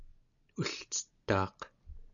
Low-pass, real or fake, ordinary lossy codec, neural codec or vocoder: 7.2 kHz; real; MP3, 96 kbps; none